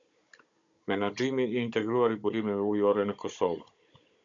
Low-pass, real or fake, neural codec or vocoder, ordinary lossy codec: 7.2 kHz; fake; codec, 16 kHz, 16 kbps, FunCodec, trained on Chinese and English, 50 frames a second; MP3, 96 kbps